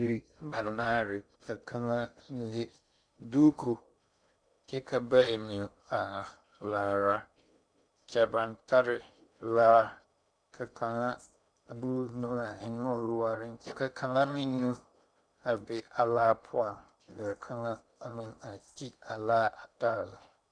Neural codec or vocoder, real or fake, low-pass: codec, 16 kHz in and 24 kHz out, 0.6 kbps, FocalCodec, streaming, 2048 codes; fake; 9.9 kHz